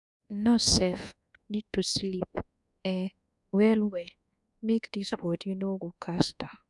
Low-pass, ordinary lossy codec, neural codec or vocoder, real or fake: 10.8 kHz; none; codec, 24 kHz, 1.2 kbps, DualCodec; fake